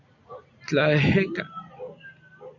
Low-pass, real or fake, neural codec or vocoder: 7.2 kHz; real; none